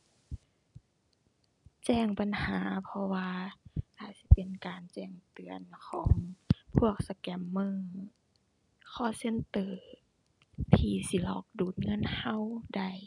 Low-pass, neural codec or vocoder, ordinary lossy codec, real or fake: 10.8 kHz; none; none; real